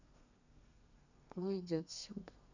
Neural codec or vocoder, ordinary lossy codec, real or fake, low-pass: codec, 32 kHz, 1.9 kbps, SNAC; none; fake; 7.2 kHz